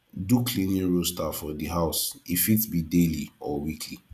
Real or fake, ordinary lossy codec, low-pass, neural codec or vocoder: real; none; 14.4 kHz; none